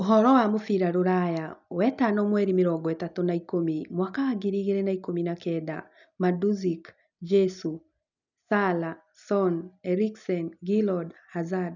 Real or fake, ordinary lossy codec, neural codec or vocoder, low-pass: real; none; none; 7.2 kHz